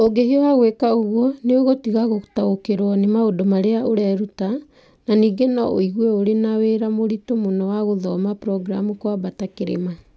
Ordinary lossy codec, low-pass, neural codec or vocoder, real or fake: none; none; none; real